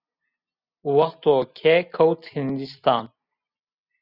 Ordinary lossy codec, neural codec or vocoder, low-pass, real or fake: Opus, 64 kbps; none; 5.4 kHz; real